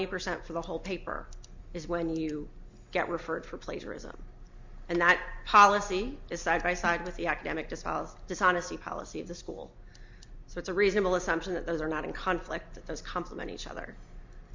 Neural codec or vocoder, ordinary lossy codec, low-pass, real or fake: none; MP3, 64 kbps; 7.2 kHz; real